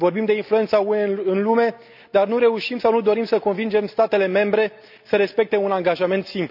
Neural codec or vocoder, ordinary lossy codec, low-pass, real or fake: none; none; 5.4 kHz; real